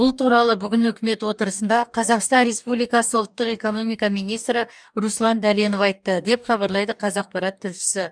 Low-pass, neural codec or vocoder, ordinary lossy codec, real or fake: 9.9 kHz; codec, 44.1 kHz, 2.6 kbps, DAC; none; fake